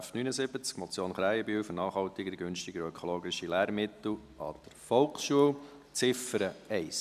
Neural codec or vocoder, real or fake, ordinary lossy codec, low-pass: none; real; none; 14.4 kHz